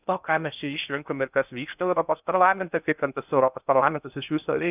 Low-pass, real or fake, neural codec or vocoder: 3.6 kHz; fake; codec, 16 kHz in and 24 kHz out, 0.6 kbps, FocalCodec, streaming, 2048 codes